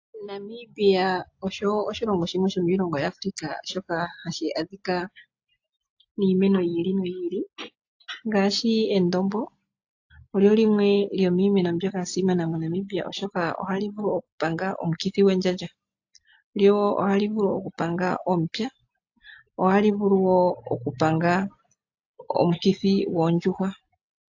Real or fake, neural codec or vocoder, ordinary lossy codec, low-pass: real; none; AAC, 48 kbps; 7.2 kHz